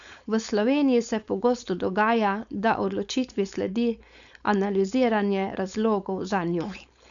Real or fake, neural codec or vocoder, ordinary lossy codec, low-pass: fake; codec, 16 kHz, 4.8 kbps, FACodec; none; 7.2 kHz